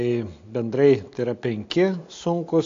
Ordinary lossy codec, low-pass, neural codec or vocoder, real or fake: AAC, 48 kbps; 7.2 kHz; none; real